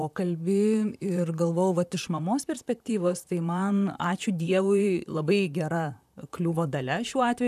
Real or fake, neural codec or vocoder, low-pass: fake; vocoder, 44.1 kHz, 128 mel bands, Pupu-Vocoder; 14.4 kHz